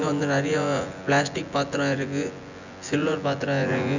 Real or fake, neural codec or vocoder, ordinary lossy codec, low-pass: fake; vocoder, 24 kHz, 100 mel bands, Vocos; none; 7.2 kHz